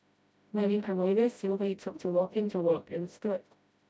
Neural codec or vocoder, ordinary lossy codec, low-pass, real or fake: codec, 16 kHz, 0.5 kbps, FreqCodec, smaller model; none; none; fake